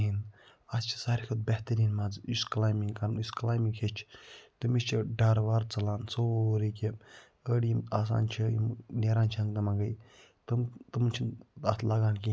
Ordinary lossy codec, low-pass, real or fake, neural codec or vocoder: none; none; real; none